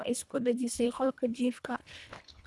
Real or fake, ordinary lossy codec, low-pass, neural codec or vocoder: fake; none; none; codec, 24 kHz, 1.5 kbps, HILCodec